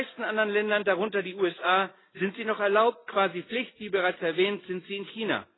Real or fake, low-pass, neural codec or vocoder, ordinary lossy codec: real; 7.2 kHz; none; AAC, 16 kbps